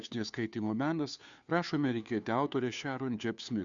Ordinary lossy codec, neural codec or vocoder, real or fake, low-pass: Opus, 64 kbps; codec, 16 kHz, 2 kbps, FunCodec, trained on Chinese and English, 25 frames a second; fake; 7.2 kHz